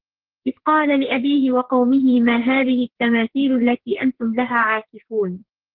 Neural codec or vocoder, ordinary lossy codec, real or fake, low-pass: codec, 44.1 kHz, 2.6 kbps, SNAC; Opus, 16 kbps; fake; 5.4 kHz